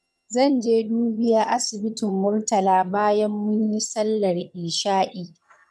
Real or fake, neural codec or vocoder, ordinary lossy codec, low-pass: fake; vocoder, 22.05 kHz, 80 mel bands, HiFi-GAN; none; none